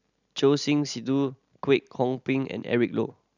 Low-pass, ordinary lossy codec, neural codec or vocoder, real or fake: 7.2 kHz; none; none; real